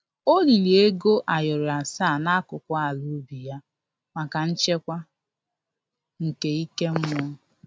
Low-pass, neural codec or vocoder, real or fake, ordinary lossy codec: none; none; real; none